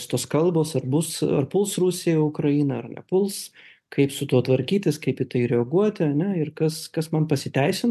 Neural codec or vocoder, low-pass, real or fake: none; 14.4 kHz; real